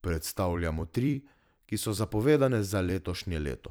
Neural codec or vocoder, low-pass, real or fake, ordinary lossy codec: vocoder, 44.1 kHz, 128 mel bands every 256 samples, BigVGAN v2; none; fake; none